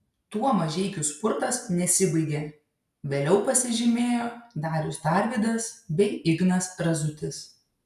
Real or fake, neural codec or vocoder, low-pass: real; none; 14.4 kHz